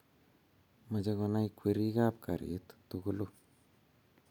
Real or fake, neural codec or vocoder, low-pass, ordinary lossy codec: real; none; 19.8 kHz; none